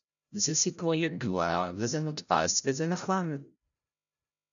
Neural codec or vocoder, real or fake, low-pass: codec, 16 kHz, 0.5 kbps, FreqCodec, larger model; fake; 7.2 kHz